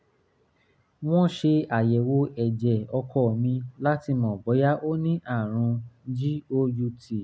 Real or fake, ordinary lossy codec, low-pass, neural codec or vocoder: real; none; none; none